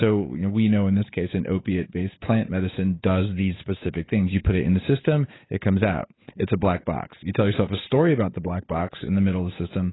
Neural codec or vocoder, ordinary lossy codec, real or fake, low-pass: codec, 16 kHz, 8 kbps, FunCodec, trained on Chinese and English, 25 frames a second; AAC, 16 kbps; fake; 7.2 kHz